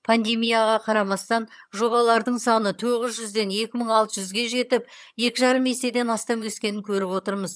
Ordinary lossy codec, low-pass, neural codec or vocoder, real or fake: none; none; vocoder, 22.05 kHz, 80 mel bands, HiFi-GAN; fake